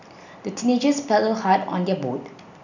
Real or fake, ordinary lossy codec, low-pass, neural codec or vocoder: real; none; 7.2 kHz; none